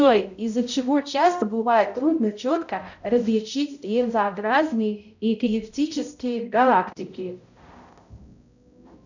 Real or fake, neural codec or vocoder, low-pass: fake; codec, 16 kHz, 0.5 kbps, X-Codec, HuBERT features, trained on balanced general audio; 7.2 kHz